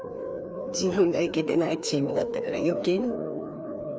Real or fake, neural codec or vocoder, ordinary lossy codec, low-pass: fake; codec, 16 kHz, 2 kbps, FreqCodec, larger model; none; none